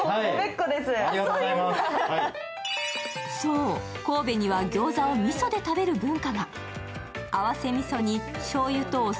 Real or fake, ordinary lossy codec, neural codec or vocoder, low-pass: real; none; none; none